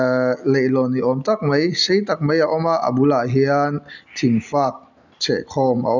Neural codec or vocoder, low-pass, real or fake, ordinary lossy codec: none; 7.2 kHz; real; none